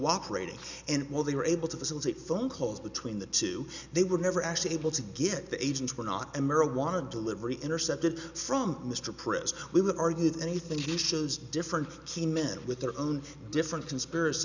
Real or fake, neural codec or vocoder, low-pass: real; none; 7.2 kHz